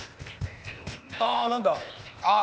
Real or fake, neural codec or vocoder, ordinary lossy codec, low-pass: fake; codec, 16 kHz, 0.8 kbps, ZipCodec; none; none